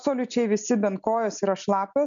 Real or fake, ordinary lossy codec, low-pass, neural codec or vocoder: real; MP3, 96 kbps; 7.2 kHz; none